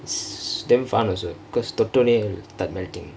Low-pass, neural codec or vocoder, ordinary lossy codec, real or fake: none; none; none; real